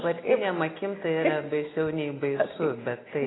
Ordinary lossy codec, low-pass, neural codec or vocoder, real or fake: AAC, 16 kbps; 7.2 kHz; none; real